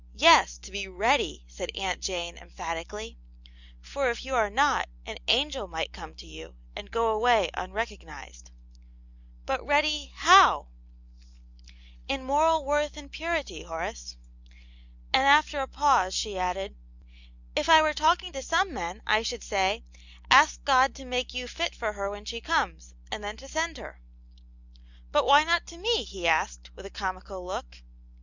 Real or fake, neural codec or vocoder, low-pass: real; none; 7.2 kHz